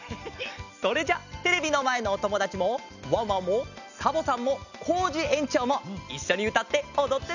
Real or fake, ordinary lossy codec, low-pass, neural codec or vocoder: real; none; 7.2 kHz; none